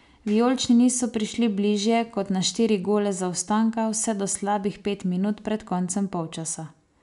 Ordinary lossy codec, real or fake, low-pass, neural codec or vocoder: none; real; 10.8 kHz; none